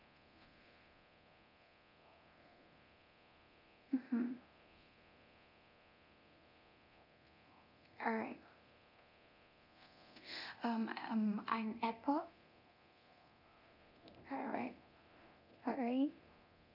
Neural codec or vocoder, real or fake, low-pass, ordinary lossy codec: codec, 24 kHz, 0.9 kbps, DualCodec; fake; 5.4 kHz; none